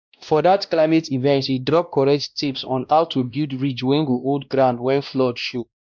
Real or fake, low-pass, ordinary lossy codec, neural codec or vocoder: fake; 7.2 kHz; none; codec, 16 kHz, 1 kbps, X-Codec, WavLM features, trained on Multilingual LibriSpeech